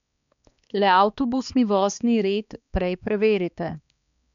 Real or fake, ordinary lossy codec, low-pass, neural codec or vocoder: fake; none; 7.2 kHz; codec, 16 kHz, 2 kbps, X-Codec, HuBERT features, trained on balanced general audio